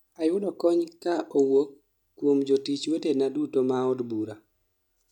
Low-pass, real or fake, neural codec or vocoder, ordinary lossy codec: 19.8 kHz; real; none; none